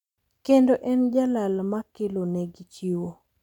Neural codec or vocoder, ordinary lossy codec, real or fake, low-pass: none; none; real; 19.8 kHz